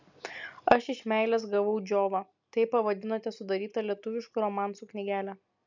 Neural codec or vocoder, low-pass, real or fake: none; 7.2 kHz; real